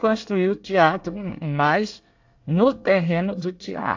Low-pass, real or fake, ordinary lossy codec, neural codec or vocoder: 7.2 kHz; fake; AAC, 48 kbps; codec, 24 kHz, 1 kbps, SNAC